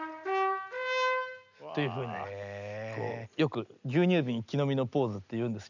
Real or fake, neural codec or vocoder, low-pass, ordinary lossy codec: real; none; 7.2 kHz; none